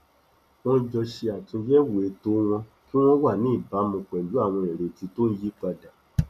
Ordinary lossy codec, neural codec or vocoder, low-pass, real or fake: none; none; 14.4 kHz; real